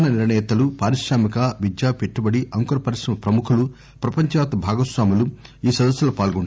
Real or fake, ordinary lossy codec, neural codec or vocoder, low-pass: real; none; none; none